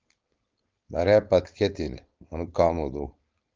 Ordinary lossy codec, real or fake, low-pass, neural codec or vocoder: Opus, 32 kbps; fake; 7.2 kHz; codec, 16 kHz, 4.8 kbps, FACodec